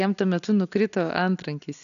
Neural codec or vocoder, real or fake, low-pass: none; real; 7.2 kHz